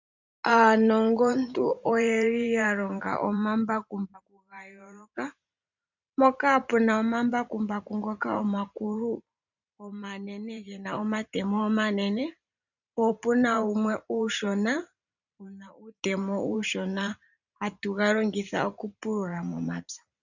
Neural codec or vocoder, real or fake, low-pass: vocoder, 44.1 kHz, 128 mel bands every 512 samples, BigVGAN v2; fake; 7.2 kHz